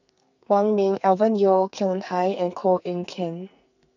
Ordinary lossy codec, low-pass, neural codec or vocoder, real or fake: none; 7.2 kHz; codec, 44.1 kHz, 2.6 kbps, SNAC; fake